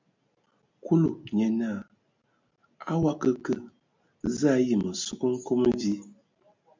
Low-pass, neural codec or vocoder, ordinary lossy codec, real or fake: 7.2 kHz; none; MP3, 64 kbps; real